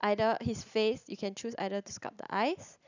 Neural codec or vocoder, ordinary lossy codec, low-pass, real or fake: none; none; 7.2 kHz; real